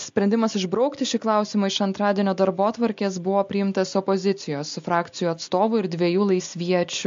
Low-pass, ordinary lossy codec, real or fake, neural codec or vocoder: 7.2 kHz; MP3, 48 kbps; real; none